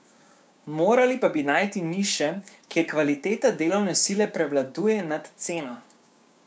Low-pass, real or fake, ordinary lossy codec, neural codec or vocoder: none; fake; none; codec, 16 kHz, 6 kbps, DAC